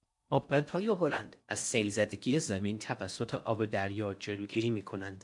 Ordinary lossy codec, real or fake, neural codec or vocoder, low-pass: MP3, 64 kbps; fake; codec, 16 kHz in and 24 kHz out, 0.6 kbps, FocalCodec, streaming, 4096 codes; 10.8 kHz